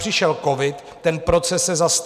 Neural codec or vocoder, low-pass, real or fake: none; 14.4 kHz; real